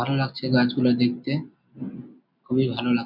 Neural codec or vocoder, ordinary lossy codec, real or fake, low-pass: none; none; real; 5.4 kHz